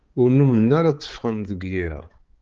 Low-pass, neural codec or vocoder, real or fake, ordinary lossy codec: 7.2 kHz; codec, 16 kHz, 4 kbps, X-Codec, HuBERT features, trained on balanced general audio; fake; Opus, 16 kbps